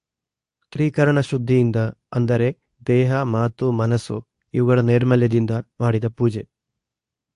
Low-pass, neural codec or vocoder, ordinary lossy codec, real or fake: 10.8 kHz; codec, 24 kHz, 0.9 kbps, WavTokenizer, medium speech release version 2; AAC, 64 kbps; fake